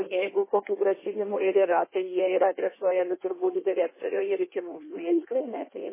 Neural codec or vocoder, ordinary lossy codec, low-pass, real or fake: codec, 16 kHz in and 24 kHz out, 1.1 kbps, FireRedTTS-2 codec; MP3, 16 kbps; 3.6 kHz; fake